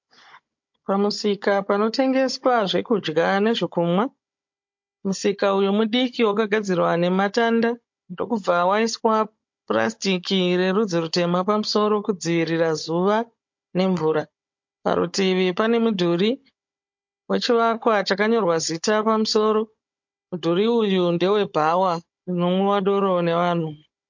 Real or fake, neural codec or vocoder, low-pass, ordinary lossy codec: fake; codec, 16 kHz, 16 kbps, FunCodec, trained on Chinese and English, 50 frames a second; 7.2 kHz; MP3, 48 kbps